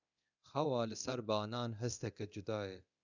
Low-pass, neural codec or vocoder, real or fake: 7.2 kHz; codec, 24 kHz, 0.9 kbps, DualCodec; fake